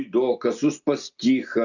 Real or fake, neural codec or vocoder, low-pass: real; none; 7.2 kHz